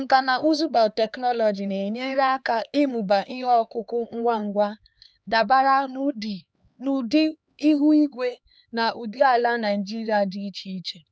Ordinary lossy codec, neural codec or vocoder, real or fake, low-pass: none; codec, 16 kHz, 4 kbps, X-Codec, HuBERT features, trained on LibriSpeech; fake; none